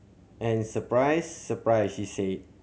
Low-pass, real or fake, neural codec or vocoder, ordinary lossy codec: none; real; none; none